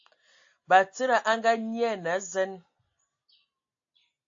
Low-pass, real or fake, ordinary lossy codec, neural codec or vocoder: 7.2 kHz; real; AAC, 48 kbps; none